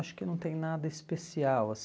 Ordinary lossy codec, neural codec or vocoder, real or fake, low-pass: none; none; real; none